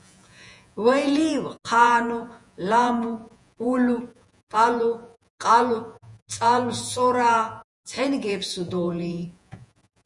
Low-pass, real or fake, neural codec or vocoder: 10.8 kHz; fake; vocoder, 48 kHz, 128 mel bands, Vocos